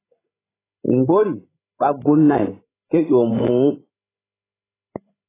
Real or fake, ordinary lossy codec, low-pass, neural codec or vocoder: fake; AAC, 16 kbps; 3.6 kHz; codec, 16 kHz, 16 kbps, FreqCodec, larger model